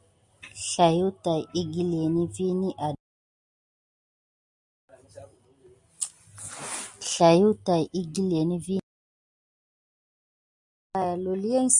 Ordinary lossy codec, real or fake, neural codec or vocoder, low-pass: Opus, 64 kbps; real; none; 10.8 kHz